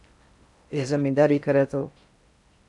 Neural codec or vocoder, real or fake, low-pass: codec, 16 kHz in and 24 kHz out, 0.6 kbps, FocalCodec, streaming, 4096 codes; fake; 10.8 kHz